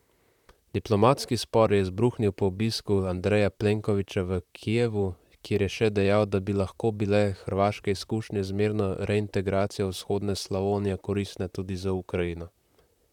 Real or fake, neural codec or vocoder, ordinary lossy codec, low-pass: fake; vocoder, 44.1 kHz, 128 mel bands, Pupu-Vocoder; none; 19.8 kHz